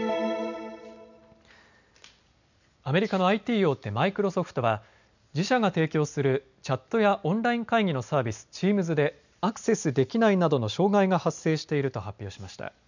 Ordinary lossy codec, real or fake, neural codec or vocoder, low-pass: none; real; none; 7.2 kHz